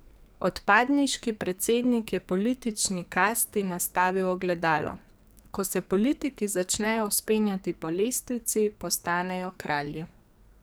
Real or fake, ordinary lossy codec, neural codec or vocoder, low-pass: fake; none; codec, 44.1 kHz, 2.6 kbps, SNAC; none